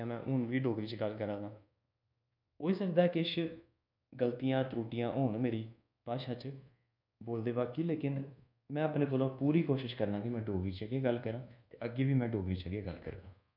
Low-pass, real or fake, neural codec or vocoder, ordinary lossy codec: 5.4 kHz; fake; codec, 24 kHz, 1.2 kbps, DualCodec; none